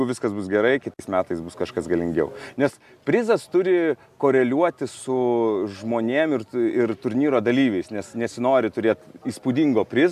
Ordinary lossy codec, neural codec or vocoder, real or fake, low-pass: AAC, 96 kbps; none; real; 14.4 kHz